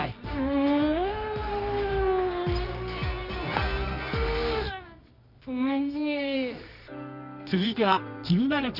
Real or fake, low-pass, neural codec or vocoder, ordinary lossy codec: fake; 5.4 kHz; codec, 24 kHz, 0.9 kbps, WavTokenizer, medium music audio release; none